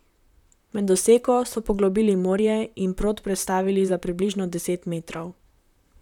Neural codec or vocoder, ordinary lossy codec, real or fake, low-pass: vocoder, 44.1 kHz, 128 mel bands, Pupu-Vocoder; none; fake; 19.8 kHz